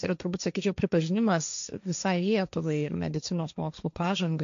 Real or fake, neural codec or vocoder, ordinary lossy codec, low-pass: fake; codec, 16 kHz, 1.1 kbps, Voila-Tokenizer; MP3, 64 kbps; 7.2 kHz